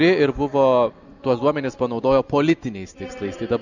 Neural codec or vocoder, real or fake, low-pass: none; real; 7.2 kHz